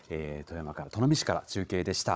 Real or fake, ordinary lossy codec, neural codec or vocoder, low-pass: fake; none; codec, 16 kHz, 16 kbps, FunCodec, trained on Chinese and English, 50 frames a second; none